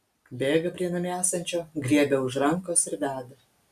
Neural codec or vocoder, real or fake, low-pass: none; real; 14.4 kHz